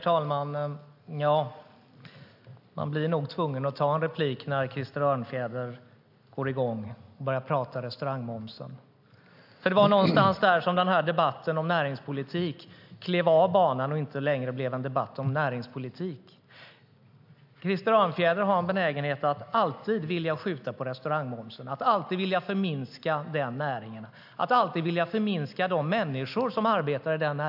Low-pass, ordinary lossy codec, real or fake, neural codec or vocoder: 5.4 kHz; AAC, 48 kbps; real; none